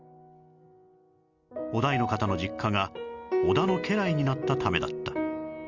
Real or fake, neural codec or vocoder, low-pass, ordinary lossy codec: real; none; 7.2 kHz; Opus, 32 kbps